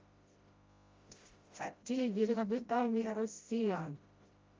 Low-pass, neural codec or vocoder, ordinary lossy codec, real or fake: 7.2 kHz; codec, 16 kHz, 0.5 kbps, FreqCodec, smaller model; Opus, 32 kbps; fake